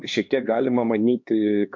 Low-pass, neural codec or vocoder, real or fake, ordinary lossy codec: 7.2 kHz; codec, 16 kHz, 2 kbps, X-Codec, HuBERT features, trained on LibriSpeech; fake; MP3, 48 kbps